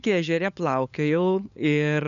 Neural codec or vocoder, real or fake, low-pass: codec, 16 kHz, 8 kbps, FunCodec, trained on LibriTTS, 25 frames a second; fake; 7.2 kHz